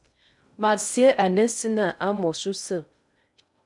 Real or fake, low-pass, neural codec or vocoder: fake; 10.8 kHz; codec, 16 kHz in and 24 kHz out, 0.6 kbps, FocalCodec, streaming, 2048 codes